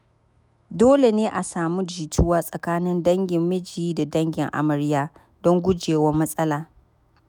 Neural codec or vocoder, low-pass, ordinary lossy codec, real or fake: autoencoder, 48 kHz, 128 numbers a frame, DAC-VAE, trained on Japanese speech; 19.8 kHz; none; fake